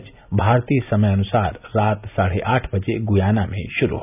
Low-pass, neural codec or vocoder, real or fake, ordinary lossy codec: 3.6 kHz; none; real; none